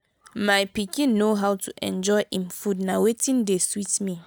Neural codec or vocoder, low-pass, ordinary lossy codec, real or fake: none; none; none; real